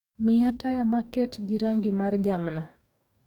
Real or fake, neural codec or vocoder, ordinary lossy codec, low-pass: fake; codec, 44.1 kHz, 2.6 kbps, DAC; none; 19.8 kHz